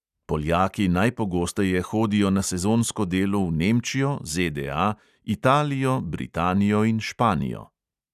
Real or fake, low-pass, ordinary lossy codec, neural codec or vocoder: real; 14.4 kHz; none; none